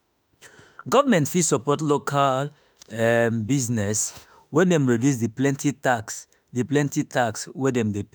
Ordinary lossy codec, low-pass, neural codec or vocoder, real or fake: none; none; autoencoder, 48 kHz, 32 numbers a frame, DAC-VAE, trained on Japanese speech; fake